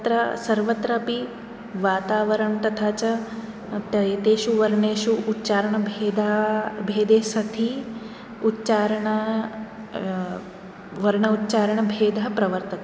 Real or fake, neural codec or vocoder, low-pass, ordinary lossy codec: real; none; none; none